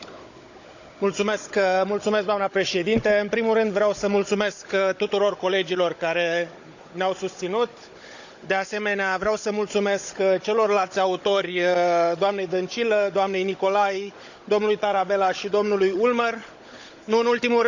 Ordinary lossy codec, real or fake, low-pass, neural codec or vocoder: none; fake; 7.2 kHz; codec, 16 kHz, 16 kbps, FunCodec, trained on Chinese and English, 50 frames a second